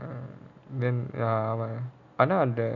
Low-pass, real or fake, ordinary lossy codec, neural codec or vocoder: 7.2 kHz; real; none; none